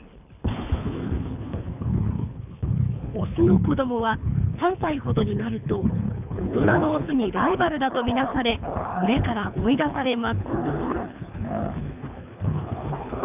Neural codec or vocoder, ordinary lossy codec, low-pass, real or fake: codec, 24 kHz, 3 kbps, HILCodec; none; 3.6 kHz; fake